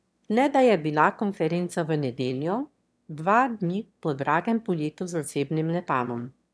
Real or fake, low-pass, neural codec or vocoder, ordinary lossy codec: fake; none; autoencoder, 22.05 kHz, a latent of 192 numbers a frame, VITS, trained on one speaker; none